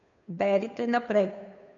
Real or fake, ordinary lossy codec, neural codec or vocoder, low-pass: fake; none; codec, 16 kHz, 2 kbps, FunCodec, trained on Chinese and English, 25 frames a second; 7.2 kHz